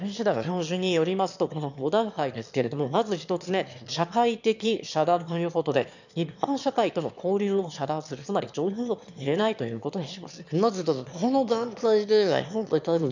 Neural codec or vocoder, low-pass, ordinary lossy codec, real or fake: autoencoder, 22.05 kHz, a latent of 192 numbers a frame, VITS, trained on one speaker; 7.2 kHz; none; fake